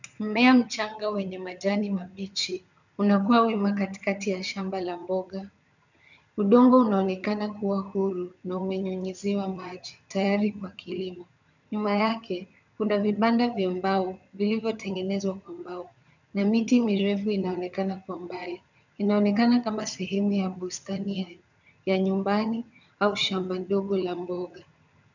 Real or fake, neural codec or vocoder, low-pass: fake; vocoder, 22.05 kHz, 80 mel bands, HiFi-GAN; 7.2 kHz